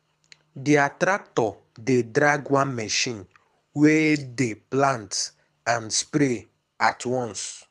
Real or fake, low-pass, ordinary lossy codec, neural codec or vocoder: fake; none; none; codec, 24 kHz, 6 kbps, HILCodec